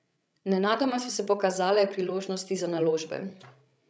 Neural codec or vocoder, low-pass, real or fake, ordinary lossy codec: codec, 16 kHz, 16 kbps, FreqCodec, larger model; none; fake; none